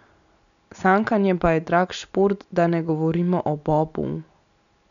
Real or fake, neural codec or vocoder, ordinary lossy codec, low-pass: real; none; none; 7.2 kHz